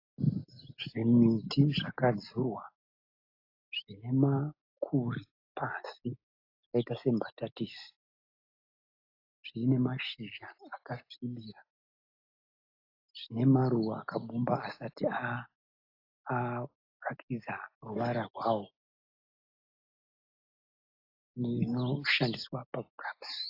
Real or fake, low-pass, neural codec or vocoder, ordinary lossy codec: real; 5.4 kHz; none; AAC, 32 kbps